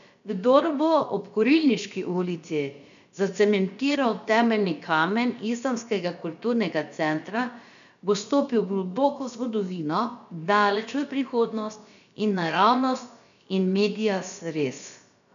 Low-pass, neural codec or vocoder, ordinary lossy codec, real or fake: 7.2 kHz; codec, 16 kHz, about 1 kbps, DyCAST, with the encoder's durations; none; fake